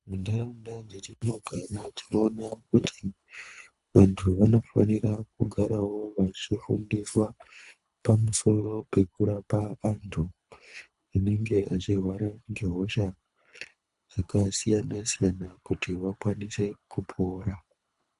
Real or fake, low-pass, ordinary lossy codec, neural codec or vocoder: fake; 10.8 kHz; AAC, 96 kbps; codec, 24 kHz, 3 kbps, HILCodec